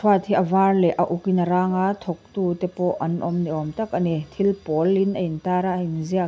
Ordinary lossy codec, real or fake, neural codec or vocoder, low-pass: none; real; none; none